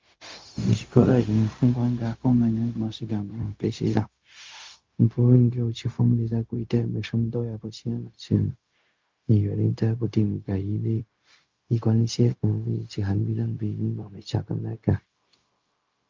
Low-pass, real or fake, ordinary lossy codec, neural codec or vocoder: 7.2 kHz; fake; Opus, 24 kbps; codec, 16 kHz, 0.4 kbps, LongCat-Audio-Codec